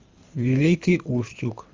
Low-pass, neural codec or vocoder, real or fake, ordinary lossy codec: 7.2 kHz; codec, 24 kHz, 3 kbps, HILCodec; fake; Opus, 32 kbps